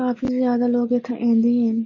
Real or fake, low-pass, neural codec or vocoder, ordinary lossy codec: real; 7.2 kHz; none; MP3, 32 kbps